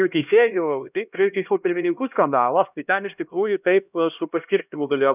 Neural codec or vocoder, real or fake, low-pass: codec, 16 kHz, 1 kbps, X-Codec, HuBERT features, trained on LibriSpeech; fake; 3.6 kHz